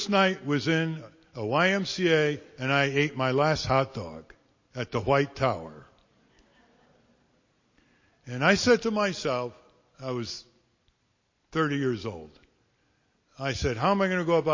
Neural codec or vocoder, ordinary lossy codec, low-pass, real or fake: none; MP3, 32 kbps; 7.2 kHz; real